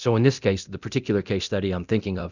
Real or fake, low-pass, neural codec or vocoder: fake; 7.2 kHz; codec, 24 kHz, 0.9 kbps, DualCodec